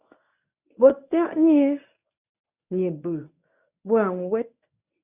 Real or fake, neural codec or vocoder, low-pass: fake; codec, 24 kHz, 0.9 kbps, WavTokenizer, medium speech release version 1; 3.6 kHz